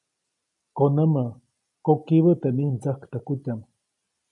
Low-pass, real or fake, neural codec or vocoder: 10.8 kHz; real; none